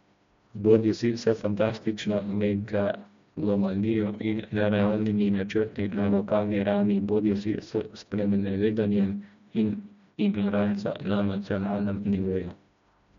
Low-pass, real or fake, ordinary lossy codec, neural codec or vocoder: 7.2 kHz; fake; MP3, 64 kbps; codec, 16 kHz, 1 kbps, FreqCodec, smaller model